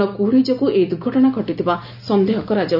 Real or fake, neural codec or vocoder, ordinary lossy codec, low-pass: real; none; none; 5.4 kHz